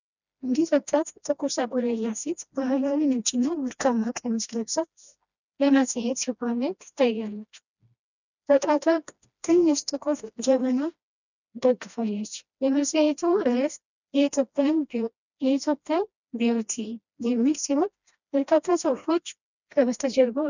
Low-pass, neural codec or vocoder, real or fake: 7.2 kHz; codec, 16 kHz, 1 kbps, FreqCodec, smaller model; fake